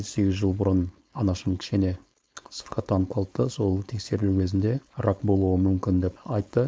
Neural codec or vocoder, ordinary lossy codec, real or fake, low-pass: codec, 16 kHz, 4.8 kbps, FACodec; none; fake; none